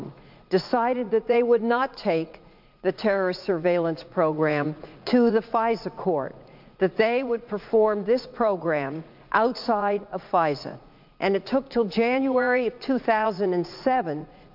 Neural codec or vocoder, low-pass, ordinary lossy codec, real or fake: vocoder, 44.1 kHz, 80 mel bands, Vocos; 5.4 kHz; MP3, 48 kbps; fake